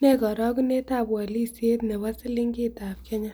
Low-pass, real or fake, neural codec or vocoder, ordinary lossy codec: none; real; none; none